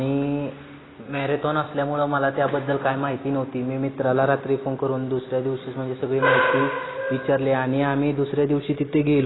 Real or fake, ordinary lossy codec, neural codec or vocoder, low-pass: real; AAC, 16 kbps; none; 7.2 kHz